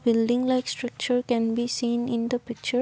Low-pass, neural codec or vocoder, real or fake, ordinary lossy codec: none; none; real; none